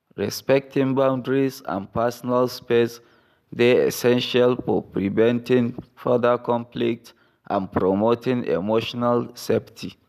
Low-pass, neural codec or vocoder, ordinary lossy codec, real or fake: 14.4 kHz; none; none; real